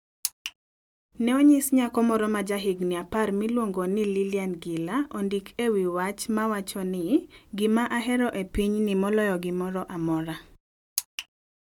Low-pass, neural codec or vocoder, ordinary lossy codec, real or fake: 19.8 kHz; none; none; real